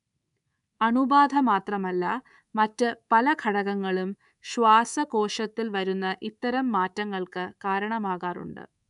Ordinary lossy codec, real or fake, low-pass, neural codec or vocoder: none; fake; 10.8 kHz; codec, 24 kHz, 3.1 kbps, DualCodec